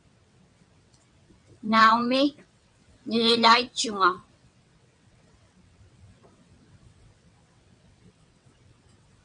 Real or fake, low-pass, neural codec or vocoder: fake; 9.9 kHz; vocoder, 22.05 kHz, 80 mel bands, WaveNeXt